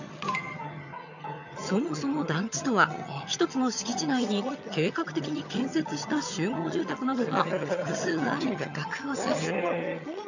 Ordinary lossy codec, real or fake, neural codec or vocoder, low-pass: none; fake; vocoder, 22.05 kHz, 80 mel bands, HiFi-GAN; 7.2 kHz